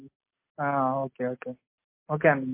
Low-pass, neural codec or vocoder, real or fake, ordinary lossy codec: 3.6 kHz; none; real; MP3, 24 kbps